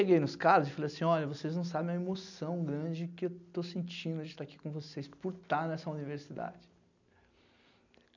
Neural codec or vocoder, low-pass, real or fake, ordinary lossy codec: none; 7.2 kHz; real; none